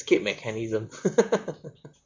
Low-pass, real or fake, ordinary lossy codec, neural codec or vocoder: 7.2 kHz; fake; MP3, 64 kbps; vocoder, 44.1 kHz, 128 mel bands, Pupu-Vocoder